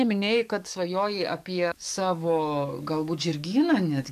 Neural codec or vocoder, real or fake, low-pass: codec, 44.1 kHz, 7.8 kbps, DAC; fake; 14.4 kHz